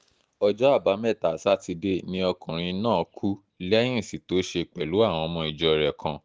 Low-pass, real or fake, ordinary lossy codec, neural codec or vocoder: none; real; none; none